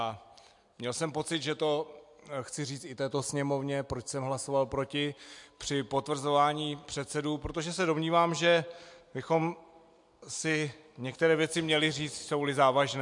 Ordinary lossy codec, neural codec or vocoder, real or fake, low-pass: MP3, 64 kbps; none; real; 10.8 kHz